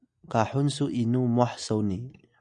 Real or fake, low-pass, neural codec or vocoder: real; 9.9 kHz; none